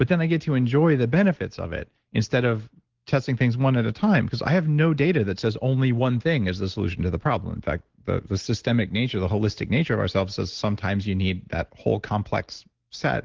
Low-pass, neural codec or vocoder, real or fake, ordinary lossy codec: 7.2 kHz; none; real; Opus, 16 kbps